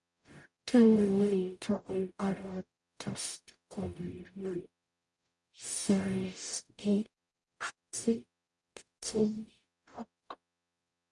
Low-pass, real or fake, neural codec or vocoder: 10.8 kHz; fake; codec, 44.1 kHz, 0.9 kbps, DAC